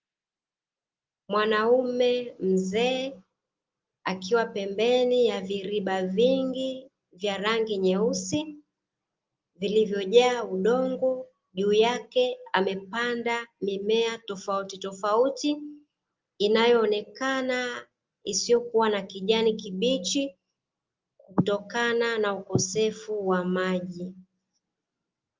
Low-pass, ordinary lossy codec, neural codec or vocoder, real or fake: 7.2 kHz; Opus, 32 kbps; none; real